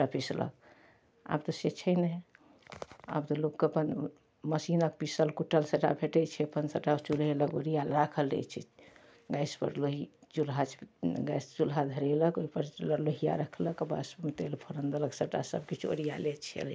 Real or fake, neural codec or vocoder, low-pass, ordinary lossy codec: real; none; none; none